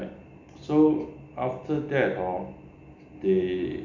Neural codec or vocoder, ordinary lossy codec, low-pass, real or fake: none; none; 7.2 kHz; real